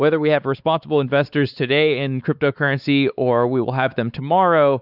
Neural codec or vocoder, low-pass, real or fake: codec, 16 kHz, 4 kbps, X-Codec, WavLM features, trained on Multilingual LibriSpeech; 5.4 kHz; fake